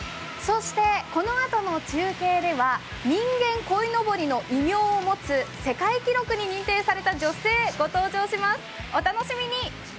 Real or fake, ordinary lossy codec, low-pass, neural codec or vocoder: real; none; none; none